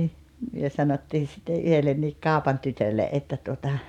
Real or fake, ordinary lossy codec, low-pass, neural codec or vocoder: fake; none; 19.8 kHz; vocoder, 44.1 kHz, 128 mel bands every 512 samples, BigVGAN v2